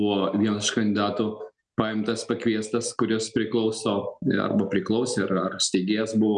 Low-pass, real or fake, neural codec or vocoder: 9.9 kHz; real; none